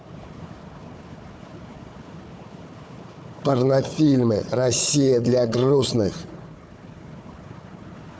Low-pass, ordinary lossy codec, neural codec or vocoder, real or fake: none; none; codec, 16 kHz, 4 kbps, FunCodec, trained on Chinese and English, 50 frames a second; fake